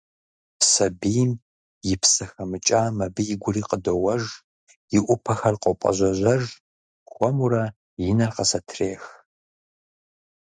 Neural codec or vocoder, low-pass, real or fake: none; 9.9 kHz; real